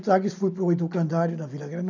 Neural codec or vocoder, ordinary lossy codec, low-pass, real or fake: none; none; 7.2 kHz; real